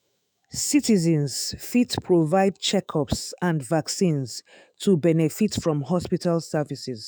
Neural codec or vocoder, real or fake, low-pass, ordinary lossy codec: autoencoder, 48 kHz, 128 numbers a frame, DAC-VAE, trained on Japanese speech; fake; none; none